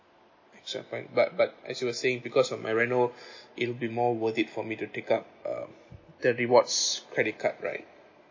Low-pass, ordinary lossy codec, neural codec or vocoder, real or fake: 7.2 kHz; MP3, 32 kbps; none; real